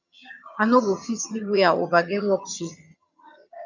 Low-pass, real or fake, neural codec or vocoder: 7.2 kHz; fake; vocoder, 22.05 kHz, 80 mel bands, HiFi-GAN